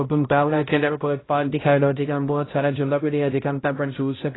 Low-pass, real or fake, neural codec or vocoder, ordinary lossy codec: 7.2 kHz; fake; codec, 16 kHz, 0.5 kbps, X-Codec, HuBERT features, trained on balanced general audio; AAC, 16 kbps